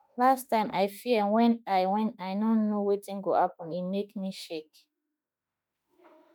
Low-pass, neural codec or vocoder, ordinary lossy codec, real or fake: none; autoencoder, 48 kHz, 32 numbers a frame, DAC-VAE, trained on Japanese speech; none; fake